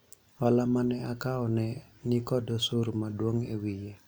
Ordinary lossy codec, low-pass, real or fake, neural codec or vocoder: none; none; real; none